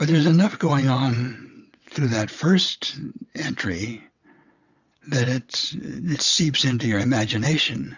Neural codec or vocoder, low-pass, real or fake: vocoder, 22.05 kHz, 80 mel bands, WaveNeXt; 7.2 kHz; fake